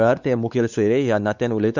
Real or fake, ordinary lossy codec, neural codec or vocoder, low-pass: fake; none; codec, 16 kHz, 4 kbps, X-Codec, WavLM features, trained on Multilingual LibriSpeech; 7.2 kHz